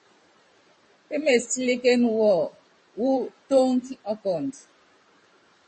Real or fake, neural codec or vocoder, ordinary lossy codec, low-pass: fake; vocoder, 44.1 kHz, 128 mel bands every 512 samples, BigVGAN v2; MP3, 32 kbps; 10.8 kHz